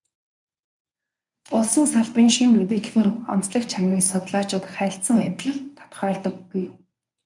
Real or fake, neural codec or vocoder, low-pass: fake; codec, 24 kHz, 0.9 kbps, WavTokenizer, medium speech release version 1; 10.8 kHz